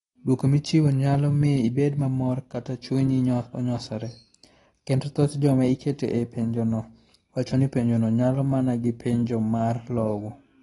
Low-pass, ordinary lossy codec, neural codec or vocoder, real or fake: 10.8 kHz; AAC, 32 kbps; none; real